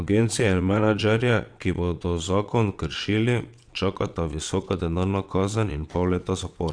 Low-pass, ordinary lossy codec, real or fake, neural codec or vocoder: 9.9 kHz; Opus, 64 kbps; fake; vocoder, 22.05 kHz, 80 mel bands, WaveNeXt